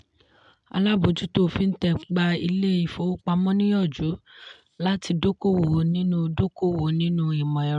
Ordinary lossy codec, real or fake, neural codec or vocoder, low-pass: MP3, 96 kbps; real; none; 10.8 kHz